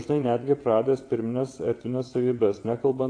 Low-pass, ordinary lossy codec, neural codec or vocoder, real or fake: 9.9 kHz; AAC, 48 kbps; vocoder, 22.05 kHz, 80 mel bands, WaveNeXt; fake